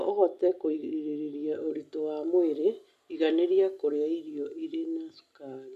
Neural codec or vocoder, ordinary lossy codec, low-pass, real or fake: none; none; 14.4 kHz; real